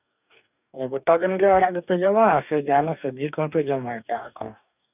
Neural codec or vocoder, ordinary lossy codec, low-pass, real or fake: codec, 44.1 kHz, 2.6 kbps, DAC; none; 3.6 kHz; fake